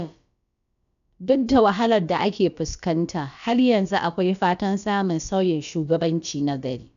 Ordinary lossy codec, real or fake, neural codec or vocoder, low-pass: none; fake; codec, 16 kHz, about 1 kbps, DyCAST, with the encoder's durations; 7.2 kHz